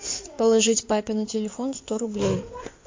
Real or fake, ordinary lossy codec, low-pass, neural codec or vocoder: fake; MP3, 48 kbps; 7.2 kHz; codec, 16 kHz in and 24 kHz out, 2.2 kbps, FireRedTTS-2 codec